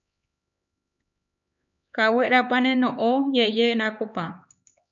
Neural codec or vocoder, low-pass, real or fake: codec, 16 kHz, 4 kbps, X-Codec, HuBERT features, trained on LibriSpeech; 7.2 kHz; fake